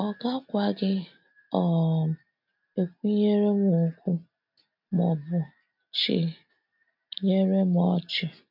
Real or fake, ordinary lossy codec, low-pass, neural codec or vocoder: real; MP3, 48 kbps; 5.4 kHz; none